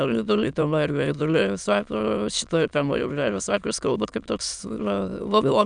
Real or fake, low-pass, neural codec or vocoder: fake; 9.9 kHz; autoencoder, 22.05 kHz, a latent of 192 numbers a frame, VITS, trained on many speakers